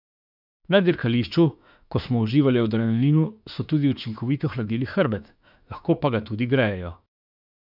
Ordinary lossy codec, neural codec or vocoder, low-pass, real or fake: none; autoencoder, 48 kHz, 32 numbers a frame, DAC-VAE, trained on Japanese speech; 5.4 kHz; fake